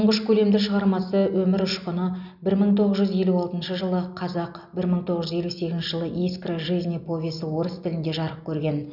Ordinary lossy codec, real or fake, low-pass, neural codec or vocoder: none; real; 5.4 kHz; none